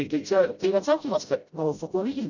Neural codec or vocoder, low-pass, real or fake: codec, 16 kHz, 0.5 kbps, FreqCodec, smaller model; 7.2 kHz; fake